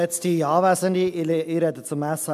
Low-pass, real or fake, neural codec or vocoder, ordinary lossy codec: 14.4 kHz; real; none; MP3, 96 kbps